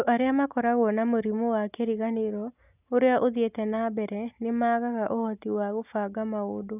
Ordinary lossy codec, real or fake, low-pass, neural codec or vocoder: none; real; 3.6 kHz; none